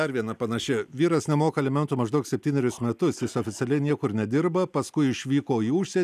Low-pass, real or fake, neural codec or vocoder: 14.4 kHz; real; none